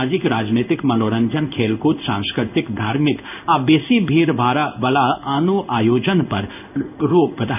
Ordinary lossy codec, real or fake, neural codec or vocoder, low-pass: none; fake; codec, 16 kHz in and 24 kHz out, 1 kbps, XY-Tokenizer; 3.6 kHz